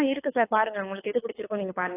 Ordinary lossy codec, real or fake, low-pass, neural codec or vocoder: AAC, 24 kbps; fake; 3.6 kHz; codec, 24 kHz, 6 kbps, HILCodec